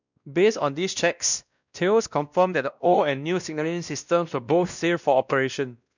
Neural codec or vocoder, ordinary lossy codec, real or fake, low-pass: codec, 16 kHz, 1 kbps, X-Codec, WavLM features, trained on Multilingual LibriSpeech; none; fake; 7.2 kHz